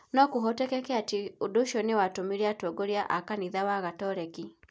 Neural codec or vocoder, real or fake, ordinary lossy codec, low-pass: none; real; none; none